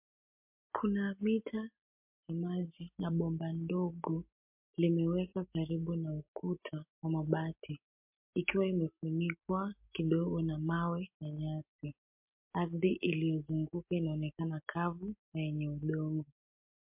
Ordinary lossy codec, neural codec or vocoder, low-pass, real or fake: MP3, 32 kbps; none; 3.6 kHz; real